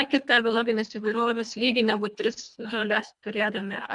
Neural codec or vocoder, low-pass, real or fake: codec, 24 kHz, 1.5 kbps, HILCodec; 10.8 kHz; fake